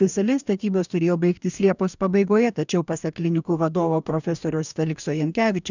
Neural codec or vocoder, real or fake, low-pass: codec, 44.1 kHz, 2.6 kbps, DAC; fake; 7.2 kHz